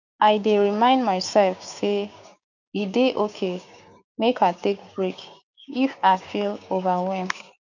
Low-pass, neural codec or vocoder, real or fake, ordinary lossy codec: 7.2 kHz; codec, 16 kHz, 6 kbps, DAC; fake; none